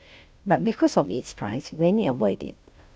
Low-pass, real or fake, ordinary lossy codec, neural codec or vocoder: none; fake; none; codec, 16 kHz, 0.5 kbps, FunCodec, trained on Chinese and English, 25 frames a second